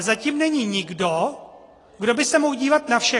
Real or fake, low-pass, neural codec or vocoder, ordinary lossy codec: real; 10.8 kHz; none; AAC, 32 kbps